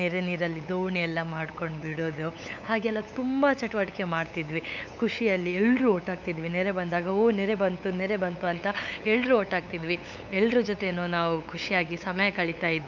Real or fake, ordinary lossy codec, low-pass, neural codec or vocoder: fake; none; 7.2 kHz; codec, 16 kHz, 8 kbps, FunCodec, trained on LibriTTS, 25 frames a second